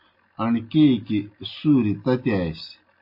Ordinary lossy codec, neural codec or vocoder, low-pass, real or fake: MP3, 32 kbps; none; 5.4 kHz; real